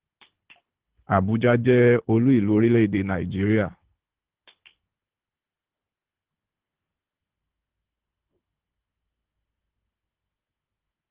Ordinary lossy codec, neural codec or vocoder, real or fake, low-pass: Opus, 16 kbps; codec, 24 kHz, 6 kbps, HILCodec; fake; 3.6 kHz